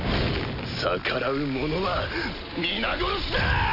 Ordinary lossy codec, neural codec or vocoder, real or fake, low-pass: none; none; real; 5.4 kHz